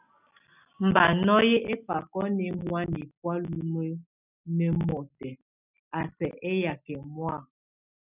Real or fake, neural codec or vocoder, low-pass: real; none; 3.6 kHz